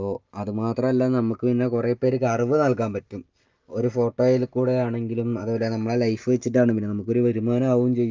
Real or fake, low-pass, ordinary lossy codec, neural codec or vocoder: real; 7.2 kHz; Opus, 24 kbps; none